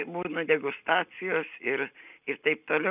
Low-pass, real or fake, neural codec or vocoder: 3.6 kHz; real; none